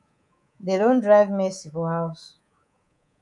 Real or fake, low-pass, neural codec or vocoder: fake; 10.8 kHz; codec, 24 kHz, 3.1 kbps, DualCodec